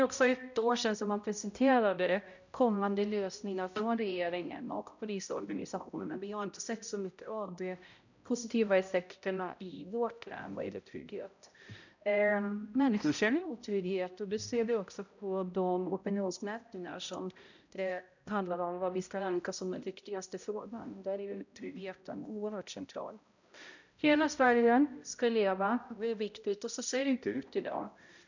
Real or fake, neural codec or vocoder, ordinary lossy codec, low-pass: fake; codec, 16 kHz, 0.5 kbps, X-Codec, HuBERT features, trained on balanced general audio; none; 7.2 kHz